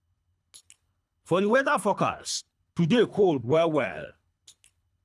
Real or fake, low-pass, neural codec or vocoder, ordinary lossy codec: fake; none; codec, 24 kHz, 3 kbps, HILCodec; none